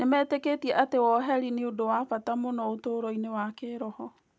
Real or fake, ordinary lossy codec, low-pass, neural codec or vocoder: real; none; none; none